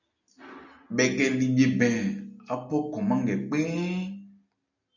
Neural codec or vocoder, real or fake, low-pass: none; real; 7.2 kHz